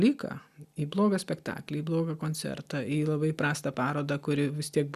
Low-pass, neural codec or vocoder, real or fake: 14.4 kHz; none; real